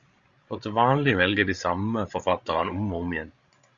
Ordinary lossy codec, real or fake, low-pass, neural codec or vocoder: Opus, 64 kbps; fake; 7.2 kHz; codec, 16 kHz, 16 kbps, FreqCodec, larger model